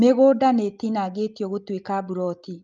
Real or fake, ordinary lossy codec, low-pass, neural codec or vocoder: real; Opus, 24 kbps; 7.2 kHz; none